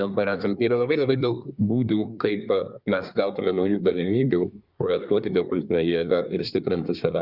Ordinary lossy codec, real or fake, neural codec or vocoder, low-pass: Opus, 64 kbps; fake; codec, 24 kHz, 1 kbps, SNAC; 5.4 kHz